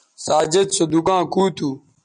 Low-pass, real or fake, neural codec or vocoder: 9.9 kHz; real; none